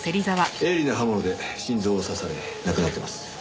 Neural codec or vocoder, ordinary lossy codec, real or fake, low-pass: none; none; real; none